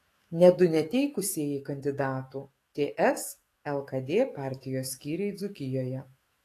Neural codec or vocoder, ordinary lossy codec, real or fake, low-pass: autoencoder, 48 kHz, 128 numbers a frame, DAC-VAE, trained on Japanese speech; AAC, 48 kbps; fake; 14.4 kHz